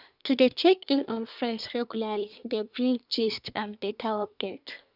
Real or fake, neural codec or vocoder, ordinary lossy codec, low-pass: fake; codec, 24 kHz, 1 kbps, SNAC; none; 5.4 kHz